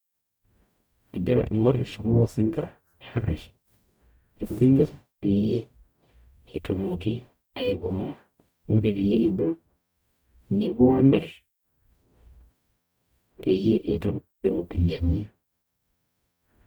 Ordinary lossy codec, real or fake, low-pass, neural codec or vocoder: none; fake; none; codec, 44.1 kHz, 0.9 kbps, DAC